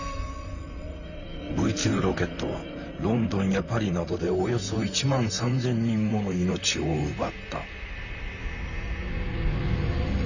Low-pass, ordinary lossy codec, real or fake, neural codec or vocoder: 7.2 kHz; none; fake; vocoder, 44.1 kHz, 128 mel bands, Pupu-Vocoder